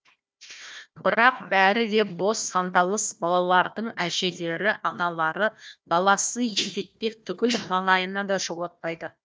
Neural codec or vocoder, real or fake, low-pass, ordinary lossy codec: codec, 16 kHz, 1 kbps, FunCodec, trained on Chinese and English, 50 frames a second; fake; none; none